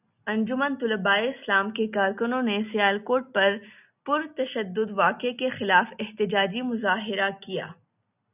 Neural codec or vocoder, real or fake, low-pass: none; real; 3.6 kHz